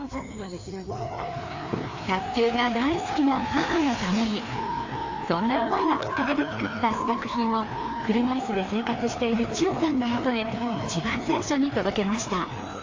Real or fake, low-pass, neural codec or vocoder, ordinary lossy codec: fake; 7.2 kHz; codec, 16 kHz, 2 kbps, FreqCodec, larger model; AAC, 48 kbps